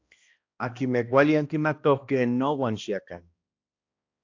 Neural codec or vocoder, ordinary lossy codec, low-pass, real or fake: codec, 16 kHz, 1 kbps, X-Codec, HuBERT features, trained on balanced general audio; AAC, 48 kbps; 7.2 kHz; fake